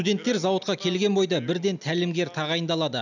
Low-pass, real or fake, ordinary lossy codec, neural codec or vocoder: 7.2 kHz; real; none; none